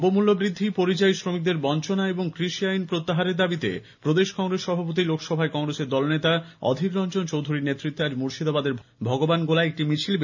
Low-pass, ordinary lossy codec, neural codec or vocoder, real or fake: 7.2 kHz; none; none; real